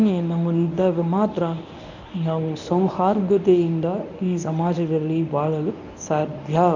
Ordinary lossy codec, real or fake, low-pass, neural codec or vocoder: none; fake; 7.2 kHz; codec, 24 kHz, 0.9 kbps, WavTokenizer, medium speech release version 1